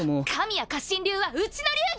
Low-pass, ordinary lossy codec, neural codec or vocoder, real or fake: none; none; none; real